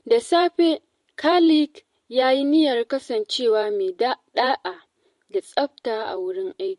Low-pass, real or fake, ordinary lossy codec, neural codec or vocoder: 14.4 kHz; fake; MP3, 48 kbps; vocoder, 44.1 kHz, 128 mel bands every 512 samples, BigVGAN v2